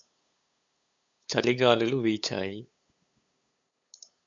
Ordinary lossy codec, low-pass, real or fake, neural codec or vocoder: AAC, 64 kbps; 7.2 kHz; fake; codec, 16 kHz, 8 kbps, FunCodec, trained on LibriTTS, 25 frames a second